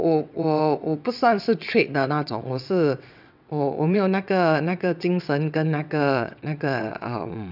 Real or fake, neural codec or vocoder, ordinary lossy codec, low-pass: fake; vocoder, 22.05 kHz, 80 mel bands, WaveNeXt; none; 5.4 kHz